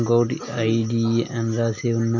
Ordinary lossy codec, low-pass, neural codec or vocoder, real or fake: none; 7.2 kHz; none; real